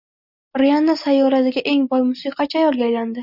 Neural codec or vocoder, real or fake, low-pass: none; real; 5.4 kHz